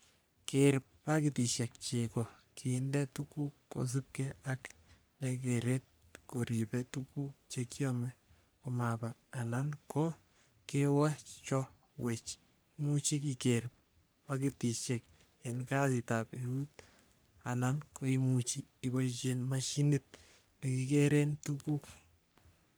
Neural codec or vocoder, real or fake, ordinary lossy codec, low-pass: codec, 44.1 kHz, 3.4 kbps, Pupu-Codec; fake; none; none